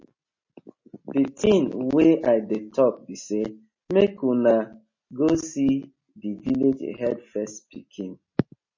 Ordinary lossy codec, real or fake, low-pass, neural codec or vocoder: MP3, 32 kbps; real; 7.2 kHz; none